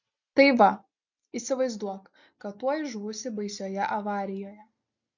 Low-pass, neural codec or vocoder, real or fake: 7.2 kHz; none; real